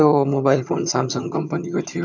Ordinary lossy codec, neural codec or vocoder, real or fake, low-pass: none; vocoder, 22.05 kHz, 80 mel bands, HiFi-GAN; fake; 7.2 kHz